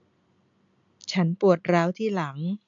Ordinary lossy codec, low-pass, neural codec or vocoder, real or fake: MP3, 64 kbps; 7.2 kHz; none; real